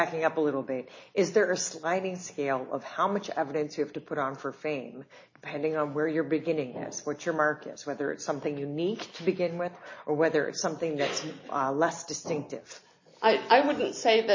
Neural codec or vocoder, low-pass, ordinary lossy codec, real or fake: none; 7.2 kHz; MP3, 32 kbps; real